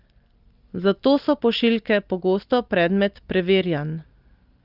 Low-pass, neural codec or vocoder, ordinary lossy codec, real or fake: 5.4 kHz; none; Opus, 32 kbps; real